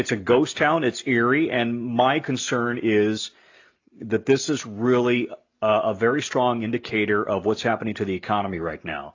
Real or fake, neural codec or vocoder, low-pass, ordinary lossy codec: real; none; 7.2 kHz; AAC, 48 kbps